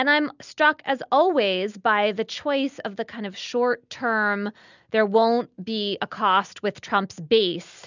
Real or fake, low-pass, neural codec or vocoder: real; 7.2 kHz; none